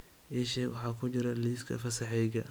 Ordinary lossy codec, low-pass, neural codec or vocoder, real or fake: none; none; vocoder, 44.1 kHz, 128 mel bands every 512 samples, BigVGAN v2; fake